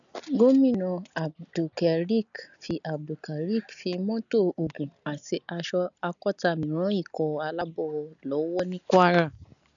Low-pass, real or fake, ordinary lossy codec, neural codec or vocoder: 7.2 kHz; real; none; none